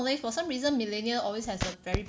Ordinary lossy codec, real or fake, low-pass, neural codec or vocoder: none; real; none; none